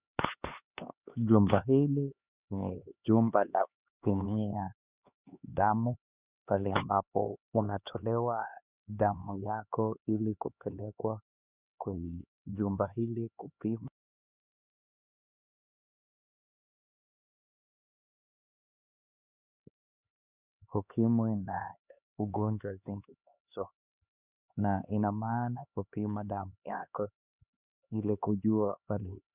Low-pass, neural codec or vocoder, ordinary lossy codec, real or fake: 3.6 kHz; codec, 16 kHz, 2 kbps, X-Codec, HuBERT features, trained on LibriSpeech; Opus, 64 kbps; fake